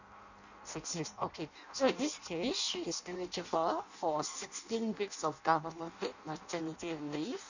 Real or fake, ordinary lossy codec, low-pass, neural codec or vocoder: fake; none; 7.2 kHz; codec, 16 kHz in and 24 kHz out, 0.6 kbps, FireRedTTS-2 codec